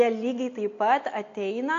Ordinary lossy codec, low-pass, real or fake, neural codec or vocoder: AAC, 48 kbps; 7.2 kHz; real; none